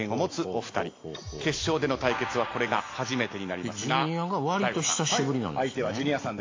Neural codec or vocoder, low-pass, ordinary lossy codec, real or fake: none; 7.2 kHz; AAC, 32 kbps; real